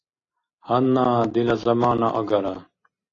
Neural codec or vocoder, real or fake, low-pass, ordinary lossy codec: none; real; 7.2 kHz; AAC, 32 kbps